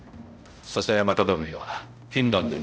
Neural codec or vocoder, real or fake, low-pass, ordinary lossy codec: codec, 16 kHz, 0.5 kbps, X-Codec, HuBERT features, trained on balanced general audio; fake; none; none